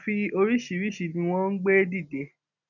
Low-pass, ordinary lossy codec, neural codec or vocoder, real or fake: 7.2 kHz; none; none; real